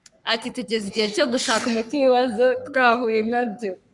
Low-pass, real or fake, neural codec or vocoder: 10.8 kHz; fake; codec, 44.1 kHz, 3.4 kbps, Pupu-Codec